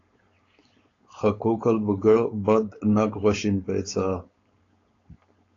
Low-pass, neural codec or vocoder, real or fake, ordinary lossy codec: 7.2 kHz; codec, 16 kHz, 4.8 kbps, FACodec; fake; AAC, 32 kbps